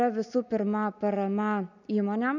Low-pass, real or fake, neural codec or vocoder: 7.2 kHz; real; none